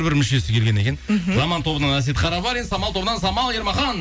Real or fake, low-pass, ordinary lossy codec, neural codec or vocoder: real; none; none; none